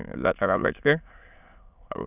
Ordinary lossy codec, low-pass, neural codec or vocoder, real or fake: none; 3.6 kHz; autoencoder, 22.05 kHz, a latent of 192 numbers a frame, VITS, trained on many speakers; fake